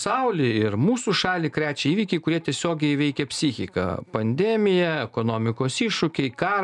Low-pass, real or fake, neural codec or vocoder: 10.8 kHz; real; none